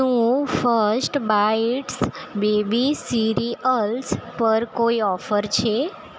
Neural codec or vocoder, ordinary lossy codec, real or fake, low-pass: none; none; real; none